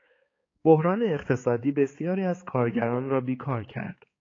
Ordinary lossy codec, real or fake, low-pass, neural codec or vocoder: AAC, 32 kbps; fake; 7.2 kHz; codec, 16 kHz, 4 kbps, X-Codec, HuBERT features, trained on balanced general audio